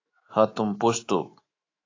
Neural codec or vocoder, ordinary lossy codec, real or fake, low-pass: autoencoder, 48 kHz, 128 numbers a frame, DAC-VAE, trained on Japanese speech; AAC, 32 kbps; fake; 7.2 kHz